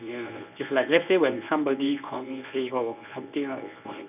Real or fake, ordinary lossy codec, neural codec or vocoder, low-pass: fake; none; codec, 24 kHz, 0.9 kbps, WavTokenizer, medium speech release version 1; 3.6 kHz